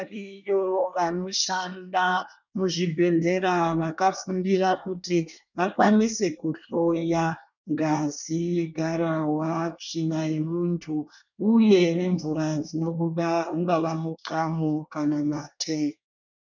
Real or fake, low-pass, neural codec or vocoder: fake; 7.2 kHz; codec, 24 kHz, 1 kbps, SNAC